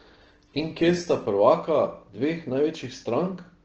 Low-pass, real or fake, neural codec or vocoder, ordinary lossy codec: 7.2 kHz; real; none; Opus, 16 kbps